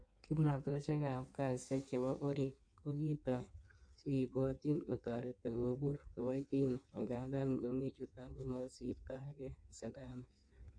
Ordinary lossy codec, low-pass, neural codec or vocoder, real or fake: AAC, 64 kbps; 9.9 kHz; codec, 16 kHz in and 24 kHz out, 1.1 kbps, FireRedTTS-2 codec; fake